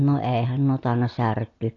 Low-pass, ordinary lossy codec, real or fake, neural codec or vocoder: 7.2 kHz; AAC, 32 kbps; real; none